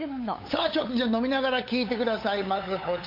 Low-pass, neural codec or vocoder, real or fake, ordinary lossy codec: 5.4 kHz; codec, 16 kHz, 8 kbps, FunCodec, trained on LibriTTS, 25 frames a second; fake; MP3, 32 kbps